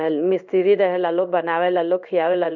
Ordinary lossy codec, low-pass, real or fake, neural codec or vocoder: none; 7.2 kHz; fake; codec, 16 kHz in and 24 kHz out, 1 kbps, XY-Tokenizer